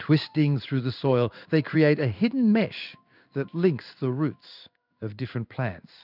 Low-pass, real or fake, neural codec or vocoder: 5.4 kHz; fake; codec, 16 kHz in and 24 kHz out, 1 kbps, XY-Tokenizer